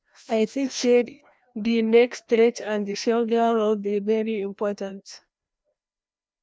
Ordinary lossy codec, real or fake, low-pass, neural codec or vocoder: none; fake; none; codec, 16 kHz, 1 kbps, FreqCodec, larger model